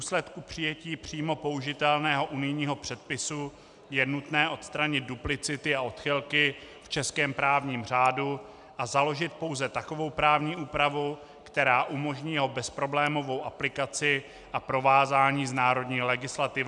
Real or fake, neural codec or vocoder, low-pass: real; none; 10.8 kHz